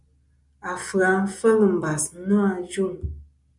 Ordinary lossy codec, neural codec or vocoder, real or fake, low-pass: MP3, 96 kbps; none; real; 10.8 kHz